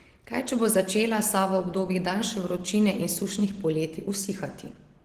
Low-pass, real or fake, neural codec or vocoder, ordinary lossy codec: 14.4 kHz; real; none; Opus, 16 kbps